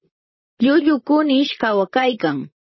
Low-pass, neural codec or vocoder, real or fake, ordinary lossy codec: 7.2 kHz; vocoder, 44.1 kHz, 128 mel bands, Pupu-Vocoder; fake; MP3, 24 kbps